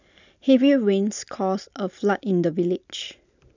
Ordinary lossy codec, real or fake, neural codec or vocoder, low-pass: none; real; none; 7.2 kHz